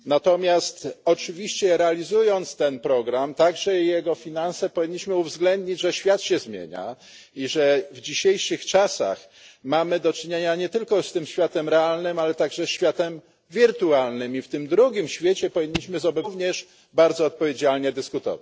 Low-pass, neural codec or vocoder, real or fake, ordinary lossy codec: none; none; real; none